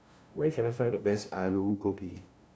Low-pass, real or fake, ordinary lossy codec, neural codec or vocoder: none; fake; none; codec, 16 kHz, 0.5 kbps, FunCodec, trained on LibriTTS, 25 frames a second